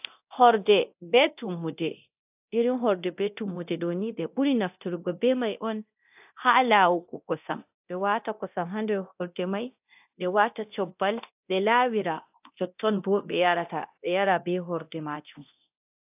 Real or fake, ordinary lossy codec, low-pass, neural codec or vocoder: fake; AAC, 32 kbps; 3.6 kHz; codec, 24 kHz, 0.9 kbps, DualCodec